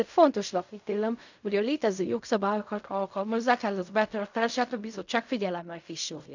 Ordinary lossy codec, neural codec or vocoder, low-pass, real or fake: none; codec, 16 kHz in and 24 kHz out, 0.4 kbps, LongCat-Audio-Codec, fine tuned four codebook decoder; 7.2 kHz; fake